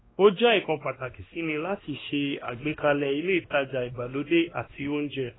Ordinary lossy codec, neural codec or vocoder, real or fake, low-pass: AAC, 16 kbps; codec, 16 kHz, 1 kbps, X-Codec, WavLM features, trained on Multilingual LibriSpeech; fake; 7.2 kHz